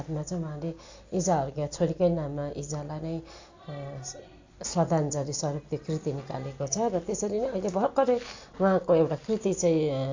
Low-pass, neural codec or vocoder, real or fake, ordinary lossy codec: 7.2 kHz; none; real; AAC, 48 kbps